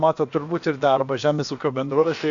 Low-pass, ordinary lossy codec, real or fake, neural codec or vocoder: 7.2 kHz; MP3, 64 kbps; fake; codec, 16 kHz, about 1 kbps, DyCAST, with the encoder's durations